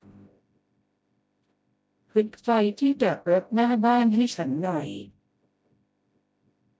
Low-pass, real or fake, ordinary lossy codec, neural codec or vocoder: none; fake; none; codec, 16 kHz, 0.5 kbps, FreqCodec, smaller model